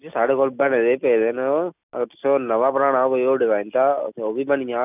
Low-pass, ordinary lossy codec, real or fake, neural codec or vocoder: 3.6 kHz; none; real; none